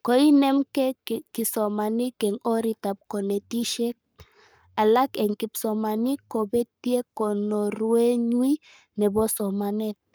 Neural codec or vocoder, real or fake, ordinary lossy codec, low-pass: codec, 44.1 kHz, 7.8 kbps, Pupu-Codec; fake; none; none